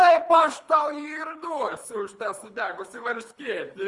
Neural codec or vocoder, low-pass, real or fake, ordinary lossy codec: codec, 24 kHz, 3 kbps, HILCodec; 10.8 kHz; fake; Opus, 32 kbps